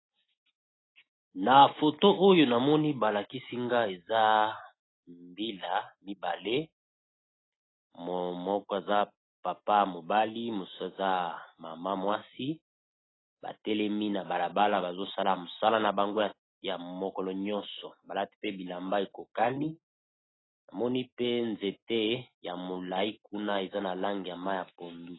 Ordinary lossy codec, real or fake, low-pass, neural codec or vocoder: AAC, 16 kbps; real; 7.2 kHz; none